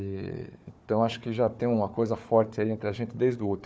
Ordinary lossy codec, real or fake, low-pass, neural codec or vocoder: none; fake; none; codec, 16 kHz, 4 kbps, FunCodec, trained on Chinese and English, 50 frames a second